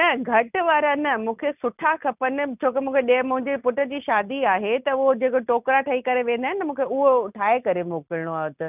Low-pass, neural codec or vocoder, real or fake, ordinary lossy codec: 3.6 kHz; none; real; none